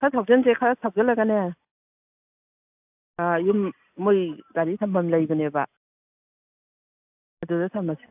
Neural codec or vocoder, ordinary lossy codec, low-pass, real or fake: none; AAC, 32 kbps; 3.6 kHz; real